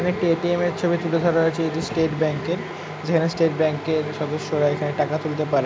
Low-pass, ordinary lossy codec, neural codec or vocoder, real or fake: none; none; none; real